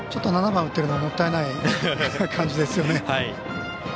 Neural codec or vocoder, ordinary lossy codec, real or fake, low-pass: none; none; real; none